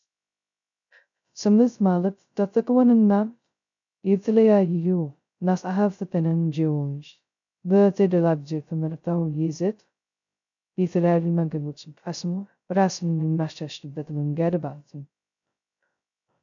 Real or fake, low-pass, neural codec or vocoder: fake; 7.2 kHz; codec, 16 kHz, 0.2 kbps, FocalCodec